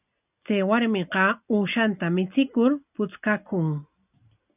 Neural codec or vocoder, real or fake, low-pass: none; real; 3.6 kHz